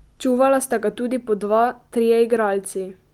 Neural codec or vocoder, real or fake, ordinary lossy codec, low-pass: none; real; Opus, 32 kbps; 19.8 kHz